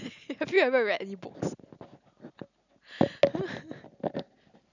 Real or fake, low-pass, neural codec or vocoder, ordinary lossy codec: real; 7.2 kHz; none; none